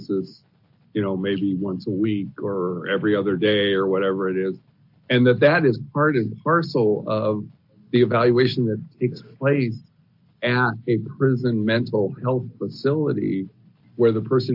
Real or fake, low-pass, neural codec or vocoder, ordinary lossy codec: real; 5.4 kHz; none; AAC, 48 kbps